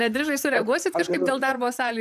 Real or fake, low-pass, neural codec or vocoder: fake; 14.4 kHz; vocoder, 44.1 kHz, 128 mel bands, Pupu-Vocoder